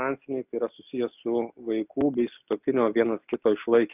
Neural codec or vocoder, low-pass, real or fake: none; 3.6 kHz; real